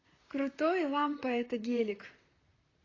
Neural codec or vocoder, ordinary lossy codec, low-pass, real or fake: vocoder, 44.1 kHz, 128 mel bands, Pupu-Vocoder; AAC, 32 kbps; 7.2 kHz; fake